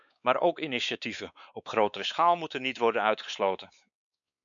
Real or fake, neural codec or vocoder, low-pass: fake; codec, 16 kHz, 4 kbps, X-Codec, WavLM features, trained on Multilingual LibriSpeech; 7.2 kHz